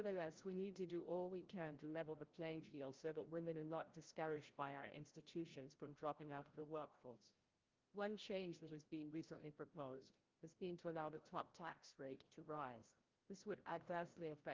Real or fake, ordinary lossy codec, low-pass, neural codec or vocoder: fake; Opus, 32 kbps; 7.2 kHz; codec, 16 kHz, 0.5 kbps, FreqCodec, larger model